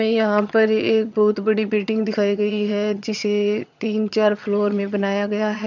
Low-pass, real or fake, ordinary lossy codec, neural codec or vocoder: 7.2 kHz; fake; none; vocoder, 22.05 kHz, 80 mel bands, HiFi-GAN